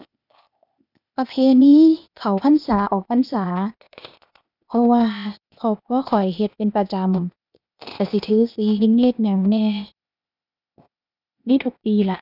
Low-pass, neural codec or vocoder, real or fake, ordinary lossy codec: 5.4 kHz; codec, 16 kHz, 0.8 kbps, ZipCodec; fake; none